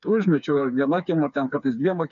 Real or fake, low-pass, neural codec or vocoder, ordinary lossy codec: fake; 7.2 kHz; codec, 16 kHz, 4 kbps, FreqCodec, smaller model; MP3, 64 kbps